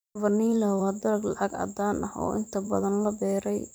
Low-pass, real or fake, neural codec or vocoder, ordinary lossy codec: none; fake; vocoder, 44.1 kHz, 128 mel bands every 512 samples, BigVGAN v2; none